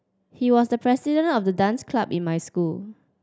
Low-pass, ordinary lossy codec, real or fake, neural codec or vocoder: none; none; real; none